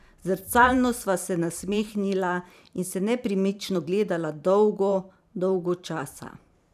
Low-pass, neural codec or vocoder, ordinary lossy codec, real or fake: 14.4 kHz; vocoder, 44.1 kHz, 128 mel bands every 512 samples, BigVGAN v2; none; fake